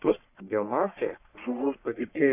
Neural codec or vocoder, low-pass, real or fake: codec, 44.1 kHz, 1.7 kbps, Pupu-Codec; 3.6 kHz; fake